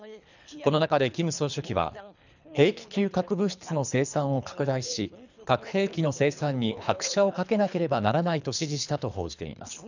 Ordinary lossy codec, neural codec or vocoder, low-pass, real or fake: none; codec, 24 kHz, 3 kbps, HILCodec; 7.2 kHz; fake